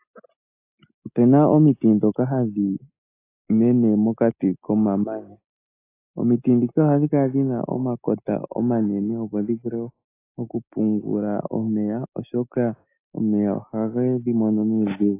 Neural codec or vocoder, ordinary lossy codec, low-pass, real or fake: none; AAC, 24 kbps; 3.6 kHz; real